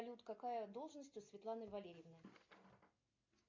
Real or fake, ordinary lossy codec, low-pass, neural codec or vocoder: real; MP3, 48 kbps; 7.2 kHz; none